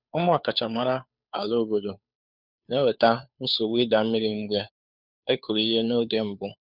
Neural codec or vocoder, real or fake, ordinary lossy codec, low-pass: codec, 16 kHz, 2 kbps, FunCodec, trained on Chinese and English, 25 frames a second; fake; none; 5.4 kHz